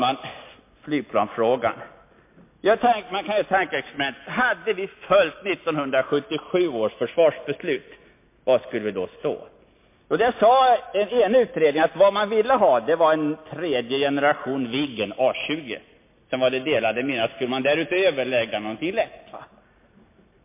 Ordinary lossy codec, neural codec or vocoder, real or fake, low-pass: MP3, 24 kbps; none; real; 3.6 kHz